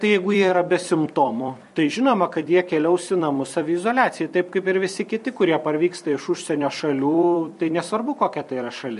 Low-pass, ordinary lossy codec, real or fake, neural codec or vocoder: 14.4 kHz; MP3, 48 kbps; fake; vocoder, 44.1 kHz, 128 mel bands every 256 samples, BigVGAN v2